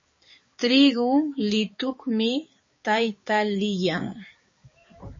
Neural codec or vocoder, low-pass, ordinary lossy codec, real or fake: codec, 16 kHz, 4 kbps, X-Codec, HuBERT features, trained on balanced general audio; 7.2 kHz; MP3, 32 kbps; fake